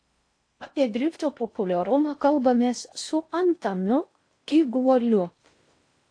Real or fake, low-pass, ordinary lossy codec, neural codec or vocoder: fake; 9.9 kHz; AAC, 48 kbps; codec, 16 kHz in and 24 kHz out, 0.6 kbps, FocalCodec, streaming, 4096 codes